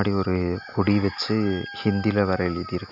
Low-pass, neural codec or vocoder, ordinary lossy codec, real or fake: 5.4 kHz; none; none; real